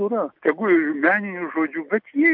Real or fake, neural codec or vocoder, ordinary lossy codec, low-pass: real; none; MP3, 48 kbps; 5.4 kHz